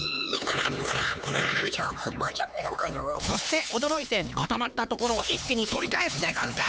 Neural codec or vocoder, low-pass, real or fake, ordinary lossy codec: codec, 16 kHz, 4 kbps, X-Codec, HuBERT features, trained on LibriSpeech; none; fake; none